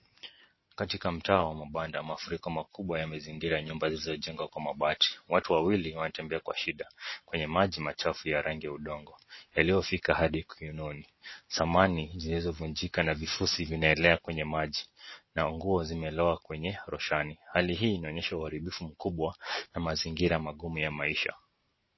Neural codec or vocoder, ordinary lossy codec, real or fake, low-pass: none; MP3, 24 kbps; real; 7.2 kHz